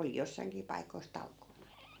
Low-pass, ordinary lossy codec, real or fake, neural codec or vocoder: none; none; real; none